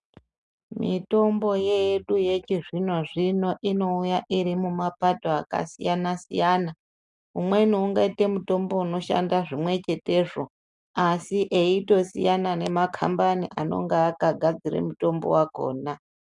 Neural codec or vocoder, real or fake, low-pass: none; real; 10.8 kHz